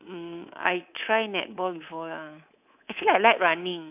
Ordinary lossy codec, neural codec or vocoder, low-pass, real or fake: none; codec, 24 kHz, 3.1 kbps, DualCodec; 3.6 kHz; fake